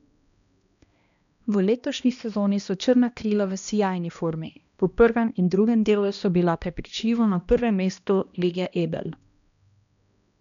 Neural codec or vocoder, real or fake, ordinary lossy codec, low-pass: codec, 16 kHz, 1 kbps, X-Codec, HuBERT features, trained on balanced general audio; fake; none; 7.2 kHz